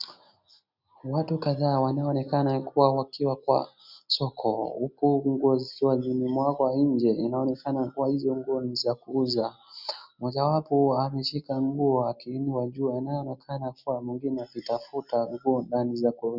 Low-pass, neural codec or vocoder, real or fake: 5.4 kHz; none; real